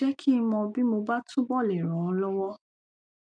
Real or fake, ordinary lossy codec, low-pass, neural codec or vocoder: real; MP3, 64 kbps; 9.9 kHz; none